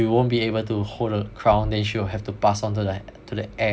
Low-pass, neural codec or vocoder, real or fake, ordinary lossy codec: none; none; real; none